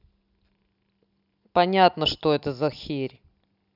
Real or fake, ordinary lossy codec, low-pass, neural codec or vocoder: real; none; 5.4 kHz; none